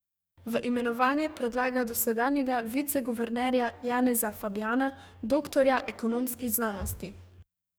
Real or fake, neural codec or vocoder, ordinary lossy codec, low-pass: fake; codec, 44.1 kHz, 2.6 kbps, DAC; none; none